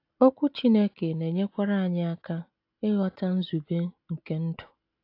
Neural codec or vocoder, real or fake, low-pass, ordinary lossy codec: none; real; 5.4 kHz; AAC, 32 kbps